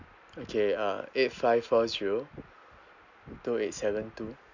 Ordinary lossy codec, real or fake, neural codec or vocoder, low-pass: none; real; none; 7.2 kHz